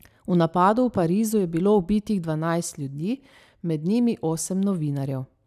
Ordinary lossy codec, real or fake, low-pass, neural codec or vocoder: none; real; 14.4 kHz; none